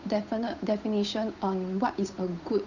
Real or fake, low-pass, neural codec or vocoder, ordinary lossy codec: fake; 7.2 kHz; codec, 16 kHz, 8 kbps, FunCodec, trained on Chinese and English, 25 frames a second; none